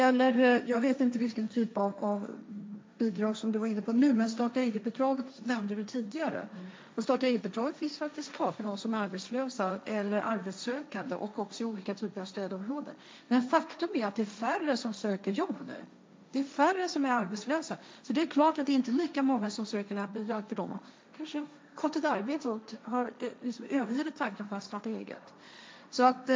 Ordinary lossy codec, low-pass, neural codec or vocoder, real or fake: none; none; codec, 16 kHz, 1.1 kbps, Voila-Tokenizer; fake